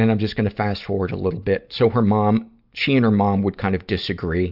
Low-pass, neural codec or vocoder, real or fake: 5.4 kHz; none; real